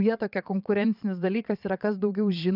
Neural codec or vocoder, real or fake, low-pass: vocoder, 22.05 kHz, 80 mel bands, Vocos; fake; 5.4 kHz